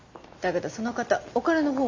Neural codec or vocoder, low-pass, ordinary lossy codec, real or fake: none; 7.2 kHz; MP3, 48 kbps; real